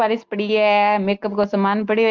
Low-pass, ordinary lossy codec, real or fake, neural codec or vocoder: 7.2 kHz; Opus, 24 kbps; real; none